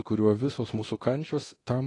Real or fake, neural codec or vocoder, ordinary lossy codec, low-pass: fake; codec, 24 kHz, 0.9 kbps, DualCodec; AAC, 32 kbps; 10.8 kHz